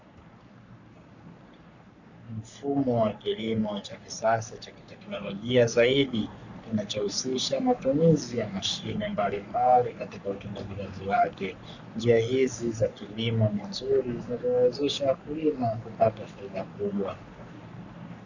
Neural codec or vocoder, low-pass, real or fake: codec, 44.1 kHz, 3.4 kbps, Pupu-Codec; 7.2 kHz; fake